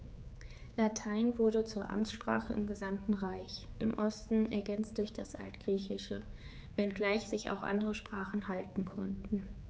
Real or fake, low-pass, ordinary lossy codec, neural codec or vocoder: fake; none; none; codec, 16 kHz, 4 kbps, X-Codec, HuBERT features, trained on general audio